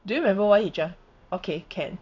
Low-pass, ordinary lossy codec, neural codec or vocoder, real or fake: 7.2 kHz; none; codec, 16 kHz in and 24 kHz out, 1 kbps, XY-Tokenizer; fake